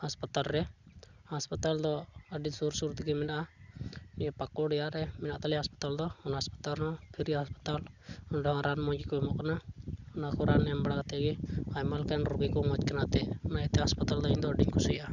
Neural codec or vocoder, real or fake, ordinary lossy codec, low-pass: none; real; none; 7.2 kHz